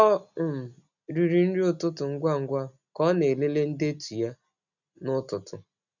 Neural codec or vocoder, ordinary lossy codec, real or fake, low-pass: none; none; real; 7.2 kHz